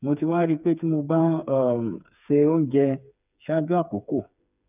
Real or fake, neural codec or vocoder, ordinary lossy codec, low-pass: fake; codec, 16 kHz, 4 kbps, FreqCodec, smaller model; none; 3.6 kHz